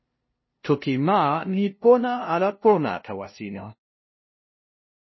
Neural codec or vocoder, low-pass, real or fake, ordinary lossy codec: codec, 16 kHz, 0.5 kbps, FunCodec, trained on LibriTTS, 25 frames a second; 7.2 kHz; fake; MP3, 24 kbps